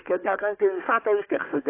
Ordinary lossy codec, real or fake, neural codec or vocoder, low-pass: AAC, 24 kbps; fake; codec, 16 kHz, 4 kbps, FunCodec, trained on Chinese and English, 50 frames a second; 3.6 kHz